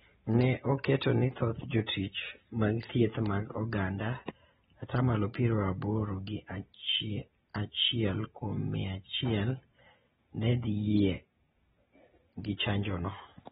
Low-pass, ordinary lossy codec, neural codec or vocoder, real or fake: 14.4 kHz; AAC, 16 kbps; none; real